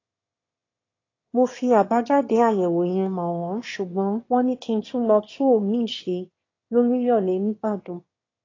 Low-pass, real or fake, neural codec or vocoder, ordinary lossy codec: 7.2 kHz; fake; autoencoder, 22.05 kHz, a latent of 192 numbers a frame, VITS, trained on one speaker; AAC, 32 kbps